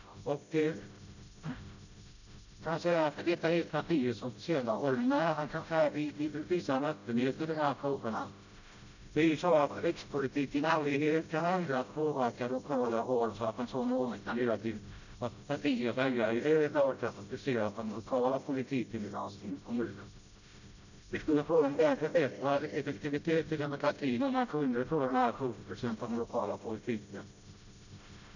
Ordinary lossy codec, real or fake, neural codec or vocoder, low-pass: none; fake; codec, 16 kHz, 0.5 kbps, FreqCodec, smaller model; 7.2 kHz